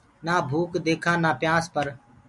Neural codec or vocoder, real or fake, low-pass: none; real; 10.8 kHz